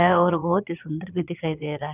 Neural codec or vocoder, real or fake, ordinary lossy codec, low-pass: vocoder, 22.05 kHz, 80 mel bands, Vocos; fake; none; 3.6 kHz